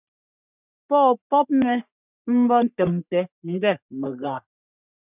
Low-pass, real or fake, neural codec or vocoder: 3.6 kHz; fake; codec, 44.1 kHz, 3.4 kbps, Pupu-Codec